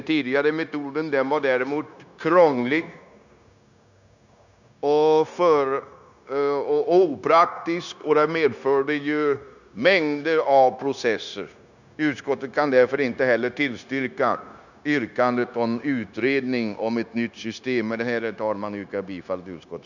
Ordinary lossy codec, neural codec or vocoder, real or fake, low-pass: none; codec, 16 kHz, 0.9 kbps, LongCat-Audio-Codec; fake; 7.2 kHz